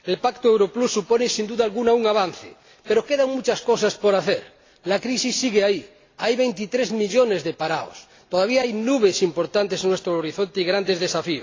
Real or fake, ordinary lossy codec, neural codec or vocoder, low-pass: real; AAC, 32 kbps; none; 7.2 kHz